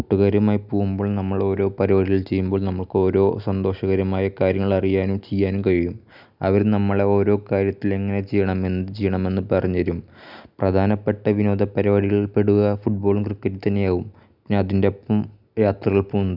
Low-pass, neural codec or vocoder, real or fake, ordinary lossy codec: 5.4 kHz; none; real; none